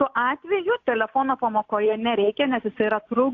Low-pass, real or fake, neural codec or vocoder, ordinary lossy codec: 7.2 kHz; real; none; AAC, 48 kbps